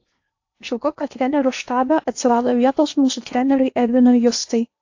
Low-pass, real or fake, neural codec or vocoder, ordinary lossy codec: 7.2 kHz; fake; codec, 16 kHz in and 24 kHz out, 0.8 kbps, FocalCodec, streaming, 65536 codes; AAC, 48 kbps